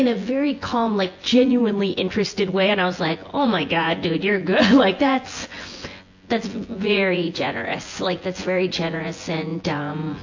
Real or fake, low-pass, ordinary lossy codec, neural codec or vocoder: fake; 7.2 kHz; AAC, 48 kbps; vocoder, 24 kHz, 100 mel bands, Vocos